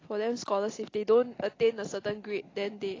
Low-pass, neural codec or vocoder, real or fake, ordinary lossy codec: 7.2 kHz; none; real; AAC, 32 kbps